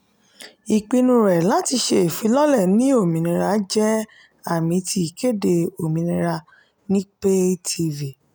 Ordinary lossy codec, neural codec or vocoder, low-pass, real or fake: none; none; none; real